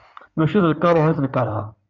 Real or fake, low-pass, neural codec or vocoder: fake; 7.2 kHz; codec, 16 kHz in and 24 kHz out, 2.2 kbps, FireRedTTS-2 codec